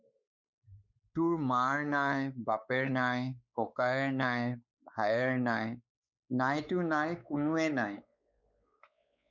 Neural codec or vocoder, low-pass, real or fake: codec, 16 kHz, 4 kbps, X-Codec, WavLM features, trained on Multilingual LibriSpeech; 7.2 kHz; fake